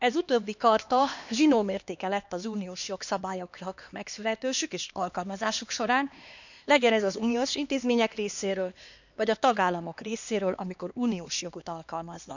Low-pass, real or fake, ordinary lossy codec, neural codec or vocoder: 7.2 kHz; fake; none; codec, 16 kHz, 2 kbps, X-Codec, HuBERT features, trained on LibriSpeech